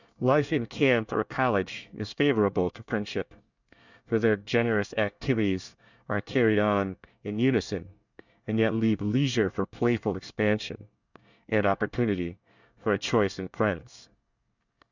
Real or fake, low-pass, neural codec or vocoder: fake; 7.2 kHz; codec, 24 kHz, 1 kbps, SNAC